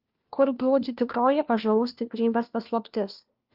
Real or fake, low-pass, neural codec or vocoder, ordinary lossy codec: fake; 5.4 kHz; codec, 16 kHz, 1 kbps, FunCodec, trained on LibriTTS, 50 frames a second; Opus, 32 kbps